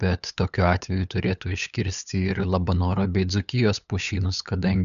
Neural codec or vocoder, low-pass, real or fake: codec, 16 kHz, 8 kbps, FunCodec, trained on LibriTTS, 25 frames a second; 7.2 kHz; fake